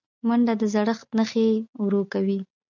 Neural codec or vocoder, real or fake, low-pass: none; real; 7.2 kHz